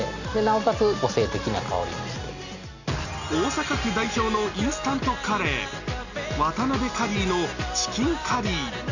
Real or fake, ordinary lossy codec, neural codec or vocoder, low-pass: real; none; none; 7.2 kHz